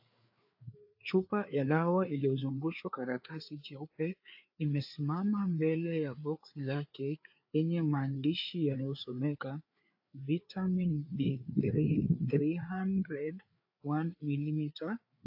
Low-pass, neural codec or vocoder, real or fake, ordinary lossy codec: 5.4 kHz; codec, 16 kHz, 4 kbps, FreqCodec, larger model; fake; AAC, 48 kbps